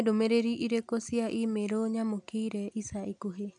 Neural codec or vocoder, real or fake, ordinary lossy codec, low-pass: none; real; none; 10.8 kHz